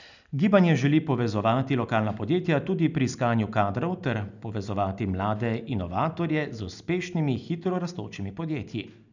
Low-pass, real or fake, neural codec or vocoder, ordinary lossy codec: 7.2 kHz; real; none; none